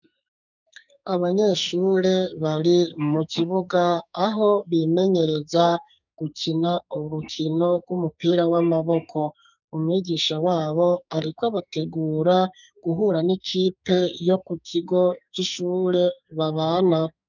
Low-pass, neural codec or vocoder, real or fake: 7.2 kHz; codec, 44.1 kHz, 2.6 kbps, SNAC; fake